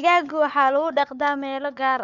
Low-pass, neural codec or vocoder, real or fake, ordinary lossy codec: 7.2 kHz; codec, 16 kHz, 4 kbps, FunCodec, trained on Chinese and English, 50 frames a second; fake; none